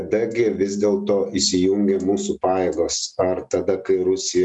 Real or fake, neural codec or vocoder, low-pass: real; none; 10.8 kHz